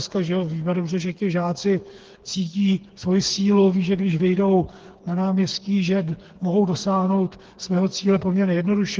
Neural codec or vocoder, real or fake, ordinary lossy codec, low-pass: codec, 16 kHz, 4 kbps, FreqCodec, smaller model; fake; Opus, 16 kbps; 7.2 kHz